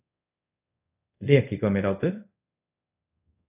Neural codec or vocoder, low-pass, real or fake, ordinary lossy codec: codec, 24 kHz, 0.5 kbps, DualCodec; 3.6 kHz; fake; AAC, 32 kbps